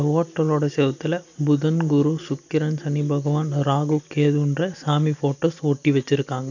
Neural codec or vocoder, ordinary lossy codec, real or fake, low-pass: none; none; real; 7.2 kHz